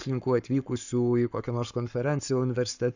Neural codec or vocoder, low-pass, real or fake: codec, 44.1 kHz, 7.8 kbps, Pupu-Codec; 7.2 kHz; fake